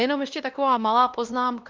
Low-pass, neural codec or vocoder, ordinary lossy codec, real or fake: 7.2 kHz; codec, 16 kHz, 1 kbps, X-Codec, WavLM features, trained on Multilingual LibriSpeech; Opus, 24 kbps; fake